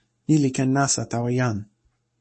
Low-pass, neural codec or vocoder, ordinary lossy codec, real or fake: 10.8 kHz; codec, 44.1 kHz, 7.8 kbps, Pupu-Codec; MP3, 32 kbps; fake